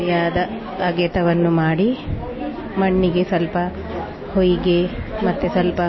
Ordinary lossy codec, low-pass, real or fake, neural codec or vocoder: MP3, 24 kbps; 7.2 kHz; real; none